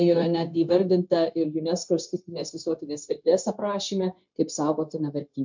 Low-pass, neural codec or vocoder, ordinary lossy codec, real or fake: 7.2 kHz; codec, 16 kHz, 0.9 kbps, LongCat-Audio-Codec; MP3, 64 kbps; fake